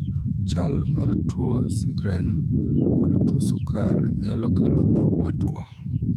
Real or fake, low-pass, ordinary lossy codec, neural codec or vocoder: fake; 19.8 kHz; none; autoencoder, 48 kHz, 32 numbers a frame, DAC-VAE, trained on Japanese speech